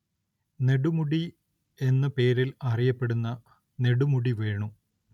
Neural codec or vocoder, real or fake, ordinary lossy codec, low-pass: none; real; none; 19.8 kHz